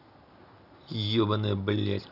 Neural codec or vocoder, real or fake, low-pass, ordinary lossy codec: none; real; 5.4 kHz; none